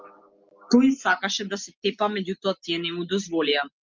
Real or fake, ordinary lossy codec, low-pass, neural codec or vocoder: real; Opus, 32 kbps; 7.2 kHz; none